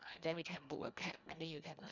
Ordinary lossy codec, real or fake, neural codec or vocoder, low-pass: none; fake; codec, 24 kHz, 1.5 kbps, HILCodec; 7.2 kHz